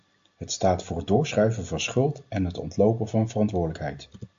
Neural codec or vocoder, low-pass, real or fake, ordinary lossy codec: none; 7.2 kHz; real; AAC, 64 kbps